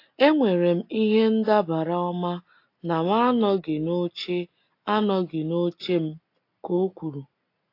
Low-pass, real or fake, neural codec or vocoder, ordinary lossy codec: 5.4 kHz; real; none; AAC, 32 kbps